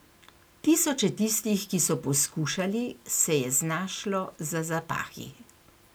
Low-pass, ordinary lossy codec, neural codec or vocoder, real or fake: none; none; none; real